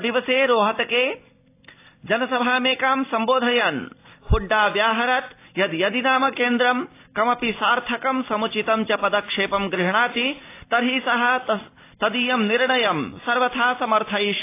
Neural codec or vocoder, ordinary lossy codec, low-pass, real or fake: none; AAC, 24 kbps; 3.6 kHz; real